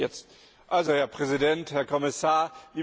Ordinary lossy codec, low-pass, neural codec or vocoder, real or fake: none; none; none; real